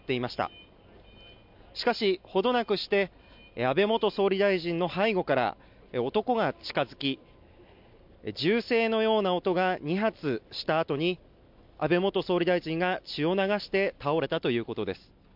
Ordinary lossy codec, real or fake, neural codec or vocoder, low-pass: MP3, 48 kbps; real; none; 5.4 kHz